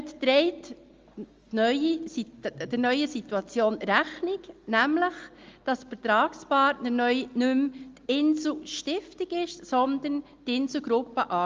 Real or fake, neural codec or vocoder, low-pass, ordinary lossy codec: real; none; 7.2 kHz; Opus, 32 kbps